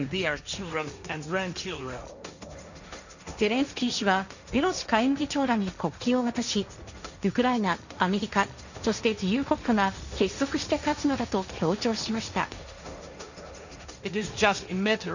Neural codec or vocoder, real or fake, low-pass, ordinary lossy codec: codec, 16 kHz, 1.1 kbps, Voila-Tokenizer; fake; 7.2 kHz; none